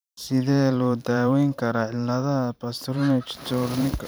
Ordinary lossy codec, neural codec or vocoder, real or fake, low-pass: none; vocoder, 44.1 kHz, 128 mel bands every 512 samples, BigVGAN v2; fake; none